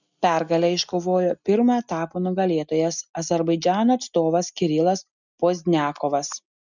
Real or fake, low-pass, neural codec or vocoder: real; 7.2 kHz; none